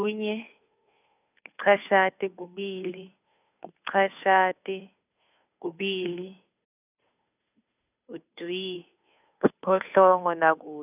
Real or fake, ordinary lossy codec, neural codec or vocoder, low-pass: fake; none; codec, 16 kHz, 2 kbps, FunCodec, trained on Chinese and English, 25 frames a second; 3.6 kHz